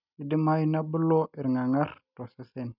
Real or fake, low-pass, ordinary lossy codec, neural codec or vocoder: real; 5.4 kHz; none; none